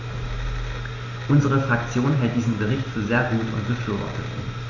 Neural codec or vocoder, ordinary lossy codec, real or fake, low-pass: none; none; real; 7.2 kHz